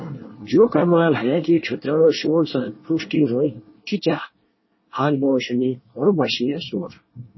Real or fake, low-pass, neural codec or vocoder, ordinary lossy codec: fake; 7.2 kHz; codec, 24 kHz, 1 kbps, SNAC; MP3, 24 kbps